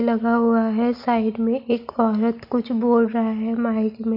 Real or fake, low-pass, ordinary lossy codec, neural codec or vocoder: real; 5.4 kHz; MP3, 48 kbps; none